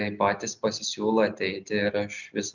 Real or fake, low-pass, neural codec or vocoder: real; 7.2 kHz; none